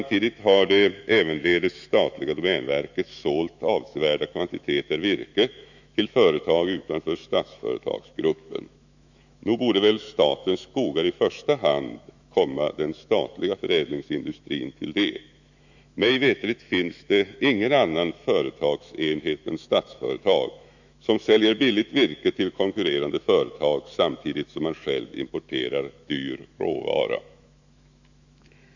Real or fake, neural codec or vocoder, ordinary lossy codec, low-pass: fake; autoencoder, 48 kHz, 128 numbers a frame, DAC-VAE, trained on Japanese speech; none; 7.2 kHz